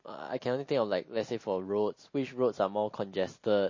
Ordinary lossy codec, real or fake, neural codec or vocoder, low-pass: MP3, 32 kbps; real; none; 7.2 kHz